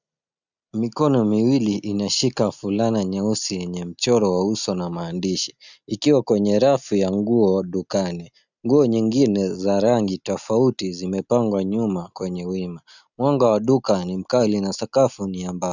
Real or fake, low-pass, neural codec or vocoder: real; 7.2 kHz; none